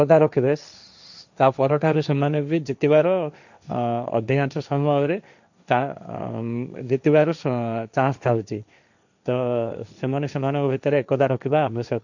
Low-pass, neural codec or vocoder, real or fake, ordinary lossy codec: 7.2 kHz; codec, 16 kHz, 1.1 kbps, Voila-Tokenizer; fake; none